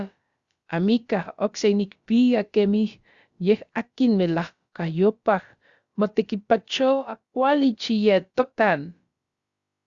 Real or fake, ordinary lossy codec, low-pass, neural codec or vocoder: fake; Opus, 64 kbps; 7.2 kHz; codec, 16 kHz, about 1 kbps, DyCAST, with the encoder's durations